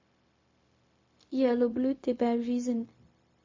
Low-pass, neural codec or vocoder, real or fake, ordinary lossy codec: 7.2 kHz; codec, 16 kHz, 0.4 kbps, LongCat-Audio-Codec; fake; MP3, 32 kbps